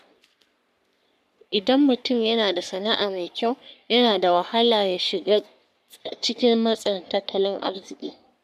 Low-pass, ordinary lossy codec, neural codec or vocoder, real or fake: 14.4 kHz; none; codec, 44.1 kHz, 3.4 kbps, Pupu-Codec; fake